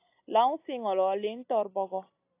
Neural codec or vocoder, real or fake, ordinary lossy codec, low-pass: none; real; AAC, 24 kbps; 3.6 kHz